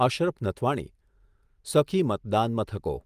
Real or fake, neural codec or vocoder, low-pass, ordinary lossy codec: real; none; 14.4 kHz; Opus, 24 kbps